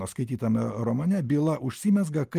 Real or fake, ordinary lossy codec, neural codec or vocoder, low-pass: real; Opus, 32 kbps; none; 14.4 kHz